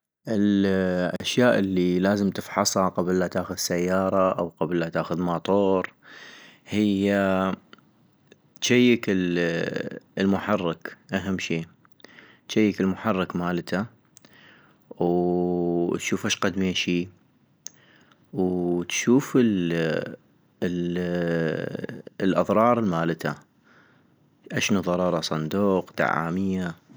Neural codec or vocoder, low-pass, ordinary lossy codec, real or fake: none; none; none; real